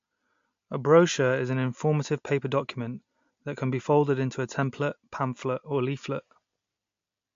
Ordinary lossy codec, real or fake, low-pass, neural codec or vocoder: MP3, 48 kbps; real; 7.2 kHz; none